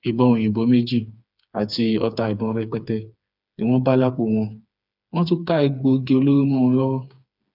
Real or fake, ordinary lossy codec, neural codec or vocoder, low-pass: fake; none; codec, 16 kHz, 4 kbps, FreqCodec, smaller model; 5.4 kHz